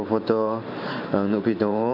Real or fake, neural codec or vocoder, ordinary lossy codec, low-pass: fake; vocoder, 44.1 kHz, 128 mel bands every 512 samples, BigVGAN v2; none; 5.4 kHz